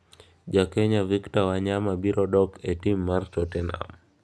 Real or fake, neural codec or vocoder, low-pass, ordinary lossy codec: real; none; none; none